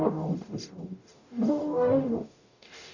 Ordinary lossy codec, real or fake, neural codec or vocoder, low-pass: none; fake; codec, 44.1 kHz, 0.9 kbps, DAC; 7.2 kHz